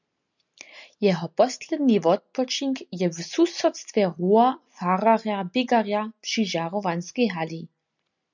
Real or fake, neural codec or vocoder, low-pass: real; none; 7.2 kHz